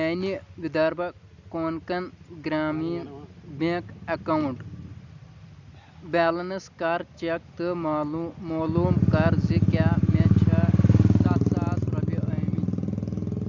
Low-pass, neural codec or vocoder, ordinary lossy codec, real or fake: 7.2 kHz; none; Opus, 64 kbps; real